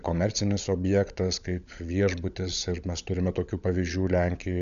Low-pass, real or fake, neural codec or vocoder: 7.2 kHz; fake; codec, 16 kHz, 16 kbps, FunCodec, trained on LibriTTS, 50 frames a second